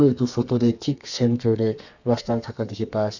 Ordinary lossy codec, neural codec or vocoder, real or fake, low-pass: none; codec, 32 kHz, 1.9 kbps, SNAC; fake; 7.2 kHz